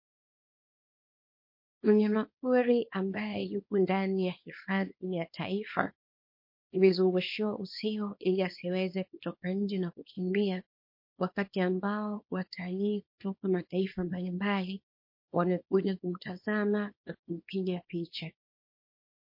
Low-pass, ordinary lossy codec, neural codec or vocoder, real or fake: 5.4 kHz; MP3, 32 kbps; codec, 24 kHz, 0.9 kbps, WavTokenizer, small release; fake